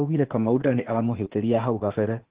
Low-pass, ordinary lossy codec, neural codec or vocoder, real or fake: 3.6 kHz; Opus, 16 kbps; codec, 16 kHz, 0.8 kbps, ZipCodec; fake